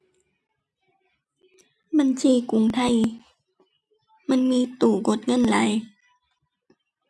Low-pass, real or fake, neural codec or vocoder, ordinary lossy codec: none; real; none; none